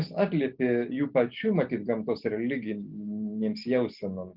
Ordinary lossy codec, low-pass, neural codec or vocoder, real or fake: Opus, 32 kbps; 5.4 kHz; none; real